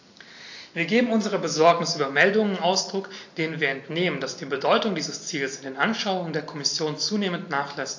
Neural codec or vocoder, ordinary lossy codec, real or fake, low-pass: none; AAC, 48 kbps; real; 7.2 kHz